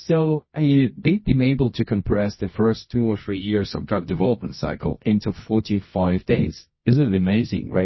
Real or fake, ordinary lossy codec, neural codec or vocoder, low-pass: fake; MP3, 24 kbps; codec, 24 kHz, 0.9 kbps, WavTokenizer, medium music audio release; 7.2 kHz